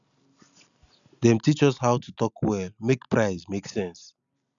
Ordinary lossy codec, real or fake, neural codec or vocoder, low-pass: none; real; none; 7.2 kHz